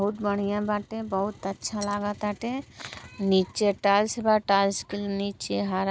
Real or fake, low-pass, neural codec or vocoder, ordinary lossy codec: real; none; none; none